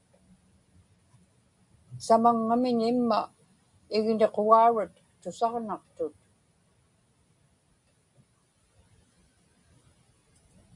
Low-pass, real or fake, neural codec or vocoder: 10.8 kHz; real; none